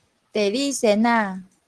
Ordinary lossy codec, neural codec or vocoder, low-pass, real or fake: Opus, 16 kbps; none; 10.8 kHz; real